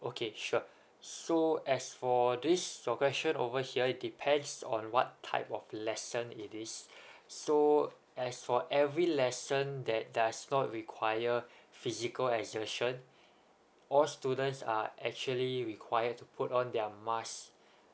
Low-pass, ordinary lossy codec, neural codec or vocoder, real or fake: none; none; none; real